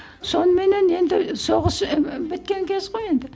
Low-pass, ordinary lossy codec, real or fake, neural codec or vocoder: none; none; real; none